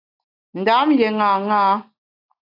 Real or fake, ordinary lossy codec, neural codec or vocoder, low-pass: real; AAC, 32 kbps; none; 5.4 kHz